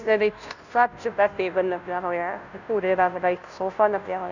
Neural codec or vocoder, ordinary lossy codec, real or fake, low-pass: codec, 16 kHz, 0.5 kbps, FunCodec, trained on Chinese and English, 25 frames a second; none; fake; 7.2 kHz